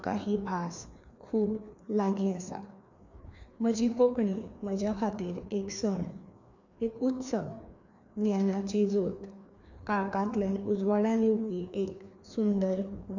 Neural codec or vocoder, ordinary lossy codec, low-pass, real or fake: codec, 16 kHz, 2 kbps, FreqCodec, larger model; none; 7.2 kHz; fake